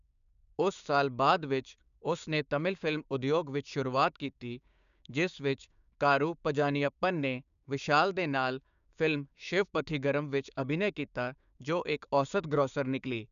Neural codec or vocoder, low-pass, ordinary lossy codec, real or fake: codec, 16 kHz, 6 kbps, DAC; 7.2 kHz; none; fake